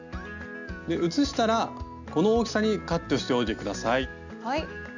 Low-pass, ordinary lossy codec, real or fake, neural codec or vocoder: 7.2 kHz; none; real; none